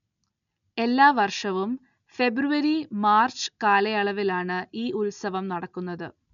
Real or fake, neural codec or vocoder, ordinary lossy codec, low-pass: real; none; none; 7.2 kHz